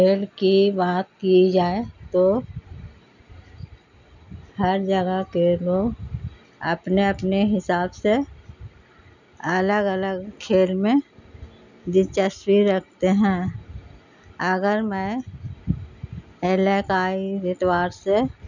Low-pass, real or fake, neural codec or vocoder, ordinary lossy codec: 7.2 kHz; real; none; none